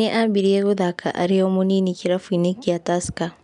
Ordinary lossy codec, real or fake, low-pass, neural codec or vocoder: MP3, 96 kbps; real; 10.8 kHz; none